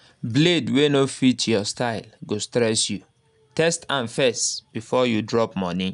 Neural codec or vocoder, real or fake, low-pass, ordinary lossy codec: none; real; 10.8 kHz; none